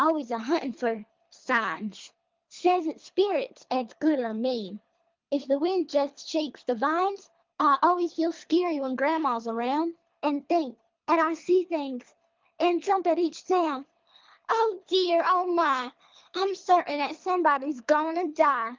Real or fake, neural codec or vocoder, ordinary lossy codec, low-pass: fake; codec, 24 kHz, 3 kbps, HILCodec; Opus, 24 kbps; 7.2 kHz